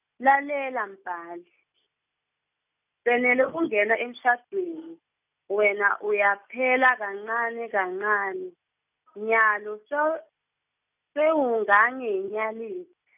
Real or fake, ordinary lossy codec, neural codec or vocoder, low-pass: real; none; none; 3.6 kHz